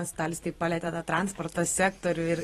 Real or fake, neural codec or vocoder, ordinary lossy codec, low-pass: fake; vocoder, 44.1 kHz, 128 mel bands every 512 samples, BigVGAN v2; AAC, 32 kbps; 19.8 kHz